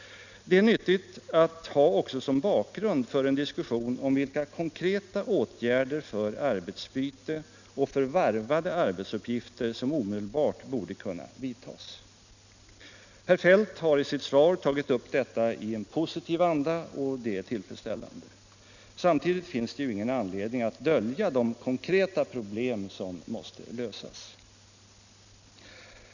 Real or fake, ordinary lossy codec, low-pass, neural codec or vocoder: real; none; 7.2 kHz; none